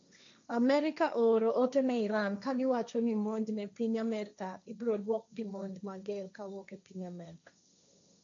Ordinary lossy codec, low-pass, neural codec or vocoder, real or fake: AAC, 64 kbps; 7.2 kHz; codec, 16 kHz, 1.1 kbps, Voila-Tokenizer; fake